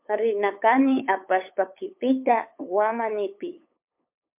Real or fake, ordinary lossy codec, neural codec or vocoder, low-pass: fake; MP3, 32 kbps; codec, 16 kHz, 8 kbps, FunCodec, trained on LibriTTS, 25 frames a second; 3.6 kHz